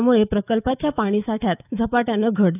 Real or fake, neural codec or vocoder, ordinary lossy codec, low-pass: fake; autoencoder, 48 kHz, 128 numbers a frame, DAC-VAE, trained on Japanese speech; none; 3.6 kHz